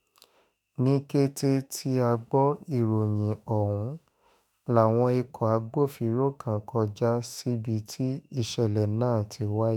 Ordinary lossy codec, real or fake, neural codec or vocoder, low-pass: none; fake; autoencoder, 48 kHz, 32 numbers a frame, DAC-VAE, trained on Japanese speech; none